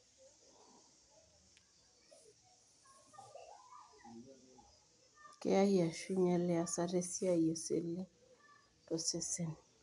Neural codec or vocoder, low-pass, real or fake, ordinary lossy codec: none; 10.8 kHz; real; none